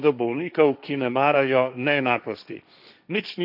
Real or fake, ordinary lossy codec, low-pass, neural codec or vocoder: fake; none; 5.4 kHz; codec, 16 kHz, 1.1 kbps, Voila-Tokenizer